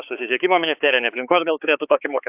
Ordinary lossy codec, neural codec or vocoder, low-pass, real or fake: AAC, 32 kbps; codec, 16 kHz, 4 kbps, X-Codec, HuBERT features, trained on balanced general audio; 3.6 kHz; fake